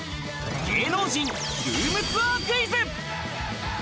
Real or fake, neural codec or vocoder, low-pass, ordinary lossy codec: real; none; none; none